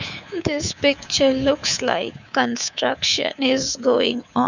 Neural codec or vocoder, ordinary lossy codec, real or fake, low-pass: codec, 24 kHz, 3.1 kbps, DualCodec; none; fake; 7.2 kHz